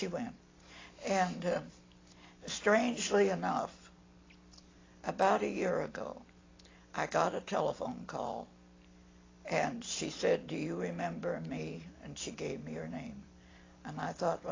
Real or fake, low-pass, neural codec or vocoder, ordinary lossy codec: real; 7.2 kHz; none; AAC, 32 kbps